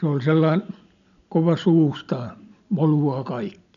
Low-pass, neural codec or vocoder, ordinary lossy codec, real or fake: 7.2 kHz; none; none; real